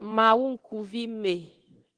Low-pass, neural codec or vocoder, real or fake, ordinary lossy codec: 9.9 kHz; codec, 24 kHz, 0.9 kbps, DualCodec; fake; Opus, 16 kbps